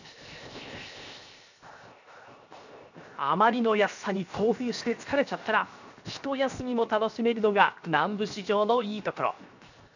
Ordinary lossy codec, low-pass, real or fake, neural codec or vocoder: none; 7.2 kHz; fake; codec, 16 kHz, 0.7 kbps, FocalCodec